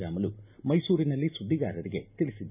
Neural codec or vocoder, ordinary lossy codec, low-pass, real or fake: none; none; 3.6 kHz; real